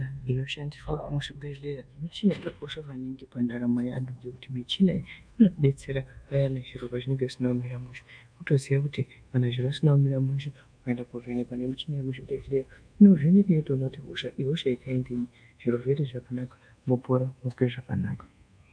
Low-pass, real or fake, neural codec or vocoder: 9.9 kHz; fake; codec, 24 kHz, 1.2 kbps, DualCodec